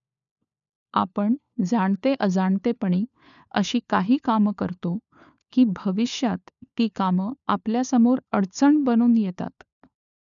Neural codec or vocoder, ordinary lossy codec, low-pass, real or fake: codec, 16 kHz, 4 kbps, FunCodec, trained on LibriTTS, 50 frames a second; none; 7.2 kHz; fake